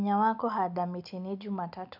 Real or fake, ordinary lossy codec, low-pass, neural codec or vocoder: real; none; 5.4 kHz; none